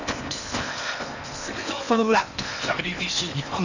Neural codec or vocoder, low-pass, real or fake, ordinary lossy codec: codec, 16 kHz in and 24 kHz out, 0.8 kbps, FocalCodec, streaming, 65536 codes; 7.2 kHz; fake; none